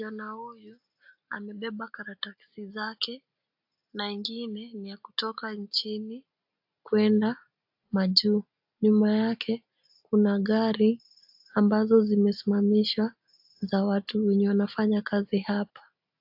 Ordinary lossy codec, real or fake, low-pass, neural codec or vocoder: AAC, 48 kbps; real; 5.4 kHz; none